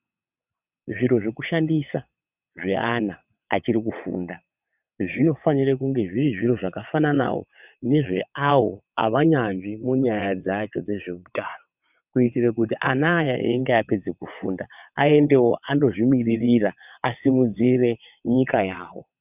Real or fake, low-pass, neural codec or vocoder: fake; 3.6 kHz; vocoder, 22.05 kHz, 80 mel bands, WaveNeXt